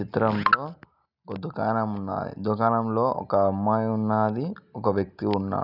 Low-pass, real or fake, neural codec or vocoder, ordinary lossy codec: 5.4 kHz; real; none; none